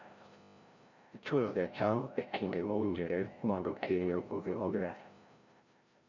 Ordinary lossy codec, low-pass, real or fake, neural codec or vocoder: Opus, 64 kbps; 7.2 kHz; fake; codec, 16 kHz, 0.5 kbps, FreqCodec, larger model